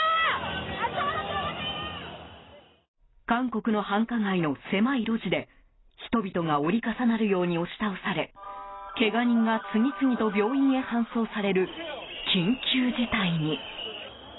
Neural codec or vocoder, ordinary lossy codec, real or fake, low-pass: none; AAC, 16 kbps; real; 7.2 kHz